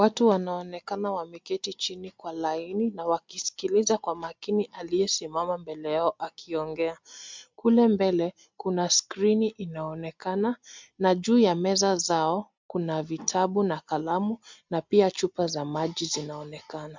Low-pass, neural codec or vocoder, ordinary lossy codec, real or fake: 7.2 kHz; none; MP3, 64 kbps; real